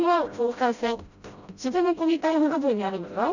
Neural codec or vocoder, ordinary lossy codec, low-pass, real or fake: codec, 16 kHz, 0.5 kbps, FreqCodec, smaller model; none; 7.2 kHz; fake